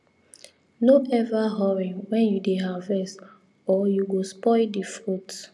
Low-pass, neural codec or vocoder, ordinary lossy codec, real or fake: none; none; none; real